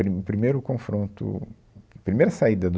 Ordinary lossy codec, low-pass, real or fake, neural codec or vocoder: none; none; real; none